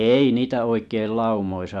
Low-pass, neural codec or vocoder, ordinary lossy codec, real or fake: none; none; none; real